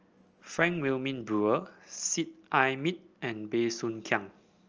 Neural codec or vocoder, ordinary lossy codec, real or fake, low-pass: none; Opus, 24 kbps; real; 7.2 kHz